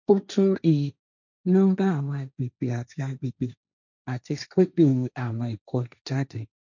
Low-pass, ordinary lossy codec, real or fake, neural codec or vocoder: 7.2 kHz; none; fake; codec, 16 kHz, 1.1 kbps, Voila-Tokenizer